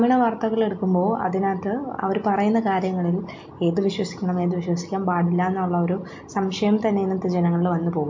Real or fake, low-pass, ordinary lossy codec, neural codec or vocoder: real; 7.2 kHz; MP3, 48 kbps; none